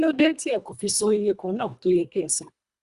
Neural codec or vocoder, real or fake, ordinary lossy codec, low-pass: codec, 24 kHz, 1.5 kbps, HILCodec; fake; Opus, 64 kbps; 10.8 kHz